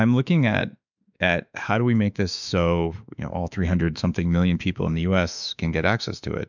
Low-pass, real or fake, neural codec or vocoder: 7.2 kHz; fake; autoencoder, 48 kHz, 32 numbers a frame, DAC-VAE, trained on Japanese speech